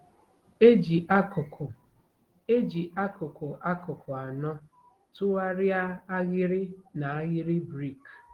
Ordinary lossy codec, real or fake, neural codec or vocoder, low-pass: Opus, 16 kbps; real; none; 19.8 kHz